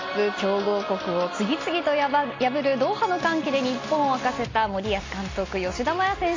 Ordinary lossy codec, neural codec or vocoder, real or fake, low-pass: AAC, 32 kbps; none; real; 7.2 kHz